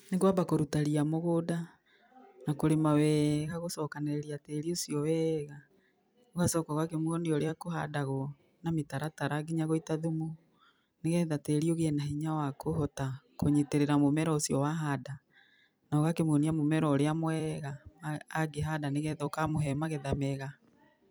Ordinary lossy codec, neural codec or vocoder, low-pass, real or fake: none; vocoder, 44.1 kHz, 128 mel bands every 256 samples, BigVGAN v2; none; fake